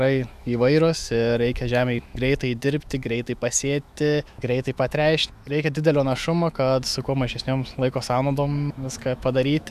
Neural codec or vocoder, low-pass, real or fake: autoencoder, 48 kHz, 128 numbers a frame, DAC-VAE, trained on Japanese speech; 14.4 kHz; fake